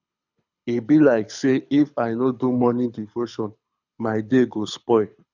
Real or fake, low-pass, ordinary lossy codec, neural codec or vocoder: fake; 7.2 kHz; none; codec, 24 kHz, 6 kbps, HILCodec